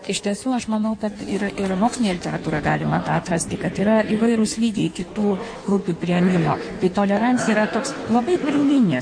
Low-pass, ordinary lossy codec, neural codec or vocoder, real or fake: 9.9 kHz; MP3, 48 kbps; codec, 16 kHz in and 24 kHz out, 1.1 kbps, FireRedTTS-2 codec; fake